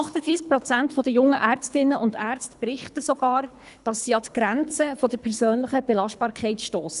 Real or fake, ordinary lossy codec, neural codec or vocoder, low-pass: fake; none; codec, 24 kHz, 3 kbps, HILCodec; 10.8 kHz